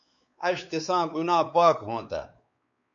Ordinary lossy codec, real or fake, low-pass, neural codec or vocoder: MP3, 48 kbps; fake; 7.2 kHz; codec, 16 kHz, 4 kbps, X-Codec, WavLM features, trained on Multilingual LibriSpeech